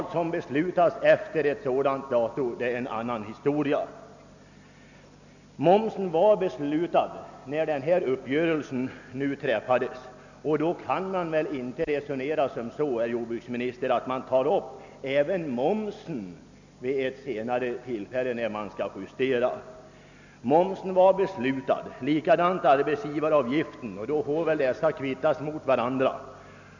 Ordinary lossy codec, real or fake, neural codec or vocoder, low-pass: none; real; none; 7.2 kHz